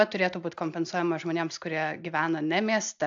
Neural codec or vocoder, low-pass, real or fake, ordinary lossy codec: none; 7.2 kHz; real; AAC, 64 kbps